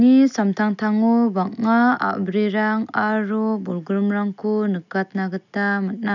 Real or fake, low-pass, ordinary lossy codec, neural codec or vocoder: real; 7.2 kHz; none; none